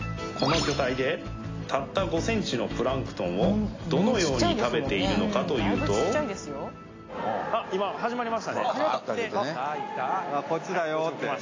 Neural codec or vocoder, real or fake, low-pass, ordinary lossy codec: none; real; 7.2 kHz; AAC, 32 kbps